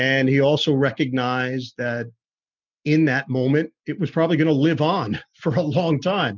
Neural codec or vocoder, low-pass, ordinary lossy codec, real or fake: none; 7.2 kHz; MP3, 64 kbps; real